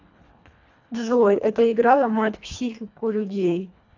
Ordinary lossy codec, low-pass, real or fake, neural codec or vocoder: AAC, 48 kbps; 7.2 kHz; fake; codec, 24 kHz, 1.5 kbps, HILCodec